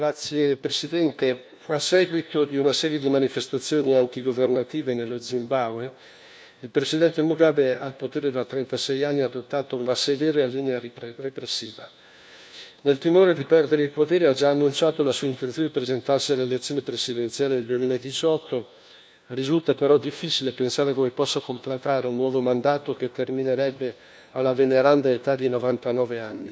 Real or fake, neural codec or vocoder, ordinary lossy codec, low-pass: fake; codec, 16 kHz, 1 kbps, FunCodec, trained on LibriTTS, 50 frames a second; none; none